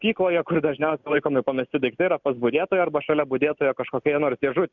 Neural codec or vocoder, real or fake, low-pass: none; real; 7.2 kHz